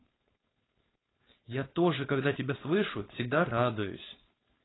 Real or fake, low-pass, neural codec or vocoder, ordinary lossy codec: fake; 7.2 kHz; codec, 16 kHz, 4.8 kbps, FACodec; AAC, 16 kbps